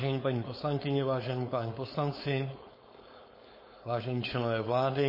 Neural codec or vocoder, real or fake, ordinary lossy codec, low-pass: codec, 16 kHz, 4.8 kbps, FACodec; fake; MP3, 24 kbps; 5.4 kHz